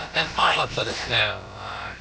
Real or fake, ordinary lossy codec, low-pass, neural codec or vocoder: fake; none; none; codec, 16 kHz, about 1 kbps, DyCAST, with the encoder's durations